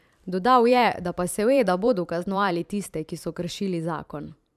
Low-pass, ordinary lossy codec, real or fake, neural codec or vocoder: 14.4 kHz; none; fake; vocoder, 44.1 kHz, 128 mel bands every 512 samples, BigVGAN v2